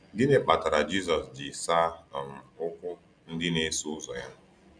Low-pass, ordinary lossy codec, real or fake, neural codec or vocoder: 9.9 kHz; none; real; none